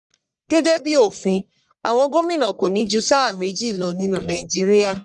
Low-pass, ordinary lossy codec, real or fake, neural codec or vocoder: 10.8 kHz; none; fake; codec, 44.1 kHz, 1.7 kbps, Pupu-Codec